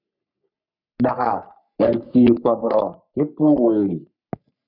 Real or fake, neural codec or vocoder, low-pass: fake; codec, 44.1 kHz, 3.4 kbps, Pupu-Codec; 5.4 kHz